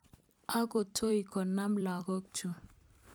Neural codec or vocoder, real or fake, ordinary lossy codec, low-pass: vocoder, 44.1 kHz, 128 mel bands every 256 samples, BigVGAN v2; fake; none; none